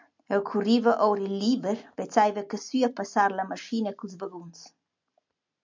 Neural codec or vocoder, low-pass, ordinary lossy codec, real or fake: none; 7.2 kHz; MP3, 64 kbps; real